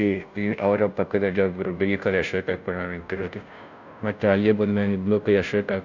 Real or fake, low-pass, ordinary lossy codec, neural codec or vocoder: fake; 7.2 kHz; none; codec, 16 kHz, 0.5 kbps, FunCodec, trained on Chinese and English, 25 frames a second